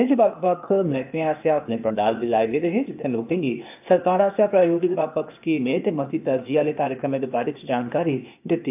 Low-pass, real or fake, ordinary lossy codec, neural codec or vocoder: 3.6 kHz; fake; AAC, 32 kbps; codec, 16 kHz, 0.8 kbps, ZipCodec